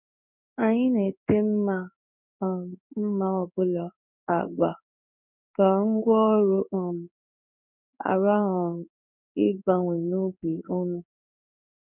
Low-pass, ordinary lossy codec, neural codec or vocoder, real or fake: 3.6 kHz; none; codec, 16 kHz in and 24 kHz out, 1 kbps, XY-Tokenizer; fake